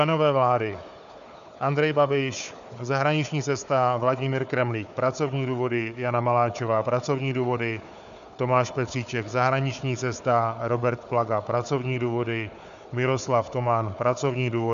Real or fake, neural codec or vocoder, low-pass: fake; codec, 16 kHz, 4 kbps, FunCodec, trained on Chinese and English, 50 frames a second; 7.2 kHz